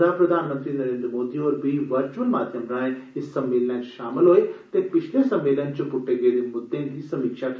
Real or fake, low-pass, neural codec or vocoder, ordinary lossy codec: real; none; none; none